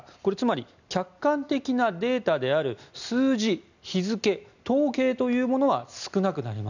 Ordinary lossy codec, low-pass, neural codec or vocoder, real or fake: none; 7.2 kHz; none; real